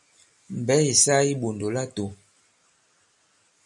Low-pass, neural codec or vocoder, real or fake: 10.8 kHz; none; real